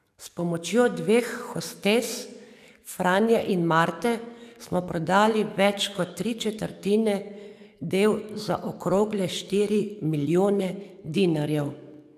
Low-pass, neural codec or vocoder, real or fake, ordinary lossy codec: 14.4 kHz; codec, 44.1 kHz, 7.8 kbps, DAC; fake; none